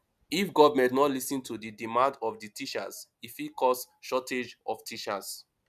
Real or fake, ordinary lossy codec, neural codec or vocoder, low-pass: real; none; none; 14.4 kHz